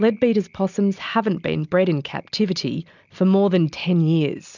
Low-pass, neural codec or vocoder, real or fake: 7.2 kHz; none; real